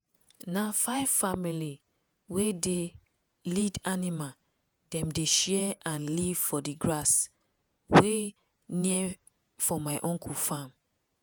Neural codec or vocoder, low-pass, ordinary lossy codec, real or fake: vocoder, 48 kHz, 128 mel bands, Vocos; none; none; fake